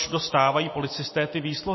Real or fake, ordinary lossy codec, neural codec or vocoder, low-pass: real; MP3, 24 kbps; none; 7.2 kHz